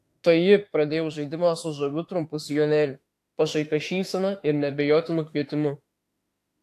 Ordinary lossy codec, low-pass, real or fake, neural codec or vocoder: AAC, 64 kbps; 14.4 kHz; fake; autoencoder, 48 kHz, 32 numbers a frame, DAC-VAE, trained on Japanese speech